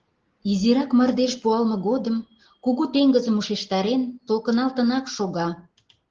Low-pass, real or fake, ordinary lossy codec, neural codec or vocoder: 7.2 kHz; real; Opus, 16 kbps; none